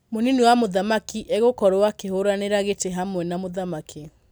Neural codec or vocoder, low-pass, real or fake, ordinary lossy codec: none; none; real; none